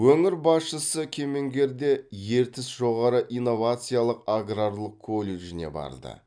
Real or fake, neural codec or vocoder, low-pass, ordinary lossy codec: real; none; 9.9 kHz; none